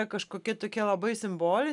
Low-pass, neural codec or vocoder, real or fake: 10.8 kHz; none; real